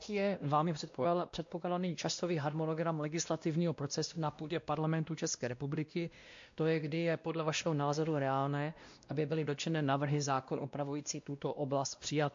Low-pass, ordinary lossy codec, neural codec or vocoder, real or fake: 7.2 kHz; MP3, 48 kbps; codec, 16 kHz, 1 kbps, X-Codec, WavLM features, trained on Multilingual LibriSpeech; fake